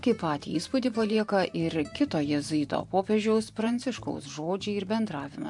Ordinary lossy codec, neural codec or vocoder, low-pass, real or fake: MP3, 64 kbps; vocoder, 44.1 kHz, 128 mel bands every 512 samples, BigVGAN v2; 10.8 kHz; fake